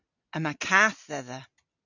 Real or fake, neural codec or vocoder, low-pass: real; none; 7.2 kHz